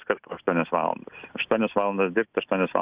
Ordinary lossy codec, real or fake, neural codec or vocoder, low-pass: Opus, 64 kbps; real; none; 3.6 kHz